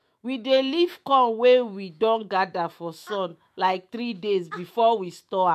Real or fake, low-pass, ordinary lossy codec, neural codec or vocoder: fake; 14.4 kHz; MP3, 64 kbps; autoencoder, 48 kHz, 128 numbers a frame, DAC-VAE, trained on Japanese speech